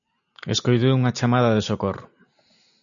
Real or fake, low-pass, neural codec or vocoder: real; 7.2 kHz; none